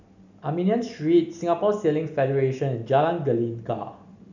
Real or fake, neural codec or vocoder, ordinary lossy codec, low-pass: real; none; none; 7.2 kHz